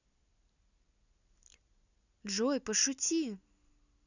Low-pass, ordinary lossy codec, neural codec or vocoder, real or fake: 7.2 kHz; none; none; real